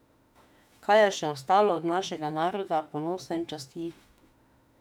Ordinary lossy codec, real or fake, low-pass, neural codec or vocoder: none; fake; 19.8 kHz; autoencoder, 48 kHz, 32 numbers a frame, DAC-VAE, trained on Japanese speech